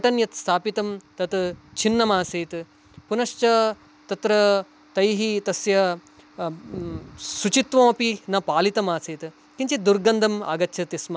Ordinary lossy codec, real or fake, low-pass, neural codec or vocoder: none; real; none; none